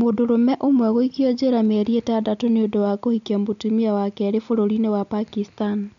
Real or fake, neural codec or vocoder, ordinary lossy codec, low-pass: real; none; none; 7.2 kHz